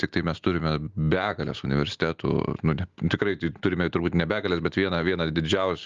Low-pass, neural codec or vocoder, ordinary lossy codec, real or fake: 7.2 kHz; none; Opus, 32 kbps; real